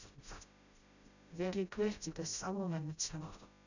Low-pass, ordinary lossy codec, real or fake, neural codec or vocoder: 7.2 kHz; none; fake; codec, 16 kHz, 0.5 kbps, FreqCodec, smaller model